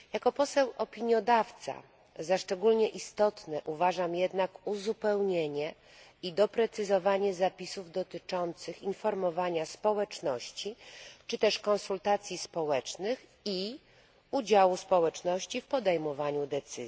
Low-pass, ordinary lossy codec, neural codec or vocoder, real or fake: none; none; none; real